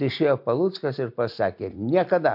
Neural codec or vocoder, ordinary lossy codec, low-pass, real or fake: none; MP3, 48 kbps; 5.4 kHz; real